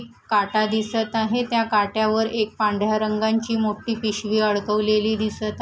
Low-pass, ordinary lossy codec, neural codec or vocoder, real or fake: none; none; none; real